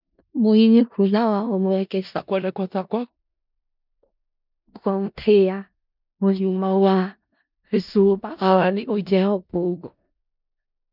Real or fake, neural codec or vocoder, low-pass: fake; codec, 16 kHz in and 24 kHz out, 0.4 kbps, LongCat-Audio-Codec, four codebook decoder; 5.4 kHz